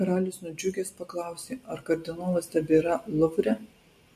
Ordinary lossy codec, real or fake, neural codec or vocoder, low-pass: MP3, 64 kbps; real; none; 14.4 kHz